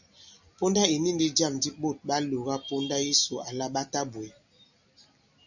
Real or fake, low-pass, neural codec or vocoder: real; 7.2 kHz; none